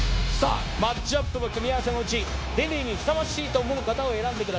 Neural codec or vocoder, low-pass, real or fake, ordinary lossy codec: codec, 16 kHz, 0.9 kbps, LongCat-Audio-Codec; none; fake; none